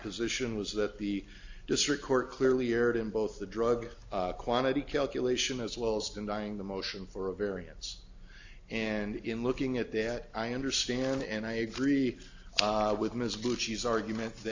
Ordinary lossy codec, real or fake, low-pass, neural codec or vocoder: AAC, 48 kbps; real; 7.2 kHz; none